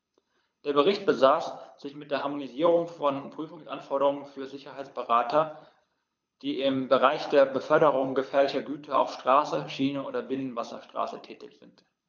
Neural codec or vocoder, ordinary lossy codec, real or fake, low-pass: codec, 24 kHz, 6 kbps, HILCodec; MP3, 64 kbps; fake; 7.2 kHz